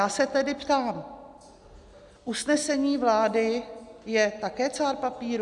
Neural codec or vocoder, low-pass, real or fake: none; 10.8 kHz; real